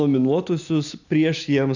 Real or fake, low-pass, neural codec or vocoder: real; 7.2 kHz; none